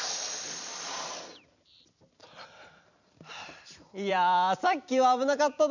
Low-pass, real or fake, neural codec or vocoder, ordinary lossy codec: 7.2 kHz; fake; vocoder, 44.1 kHz, 128 mel bands every 256 samples, BigVGAN v2; none